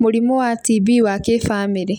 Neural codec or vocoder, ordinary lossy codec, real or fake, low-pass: none; none; real; 19.8 kHz